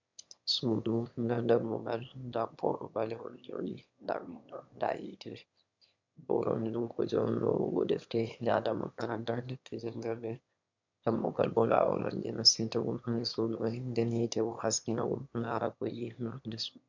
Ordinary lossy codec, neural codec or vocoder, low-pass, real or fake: MP3, 64 kbps; autoencoder, 22.05 kHz, a latent of 192 numbers a frame, VITS, trained on one speaker; 7.2 kHz; fake